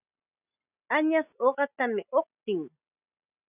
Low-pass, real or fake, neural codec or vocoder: 3.6 kHz; real; none